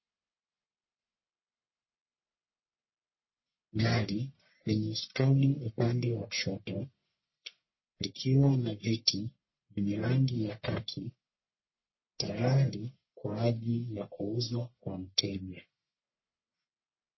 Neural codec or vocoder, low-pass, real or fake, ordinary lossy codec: codec, 44.1 kHz, 1.7 kbps, Pupu-Codec; 7.2 kHz; fake; MP3, 24 kbps